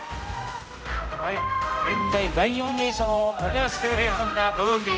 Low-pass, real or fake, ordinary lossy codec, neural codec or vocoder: none; fake; none; codec, 16 kHz, 0.5 kbps, X-Codec, HuBERT features, trained on general audio